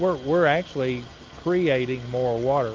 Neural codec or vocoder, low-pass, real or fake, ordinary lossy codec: none; 7.2 kHz; real; Opus, 24 kbps